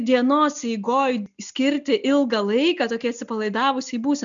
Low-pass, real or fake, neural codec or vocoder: 7.2 kHz; real; none